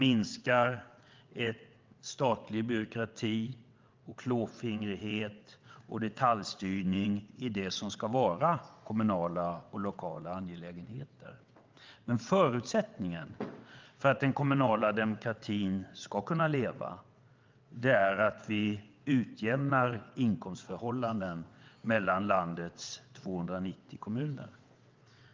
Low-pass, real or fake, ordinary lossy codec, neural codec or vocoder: 7.2 kHz; fake; Opus, 24 kbps; vocoder, 22.05 kHz, 80 mel bands, WaveNeXt